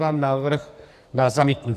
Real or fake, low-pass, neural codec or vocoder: fake; 14.4 kHz; codec, 44.1 kHz, 2.6 kbps, SNAC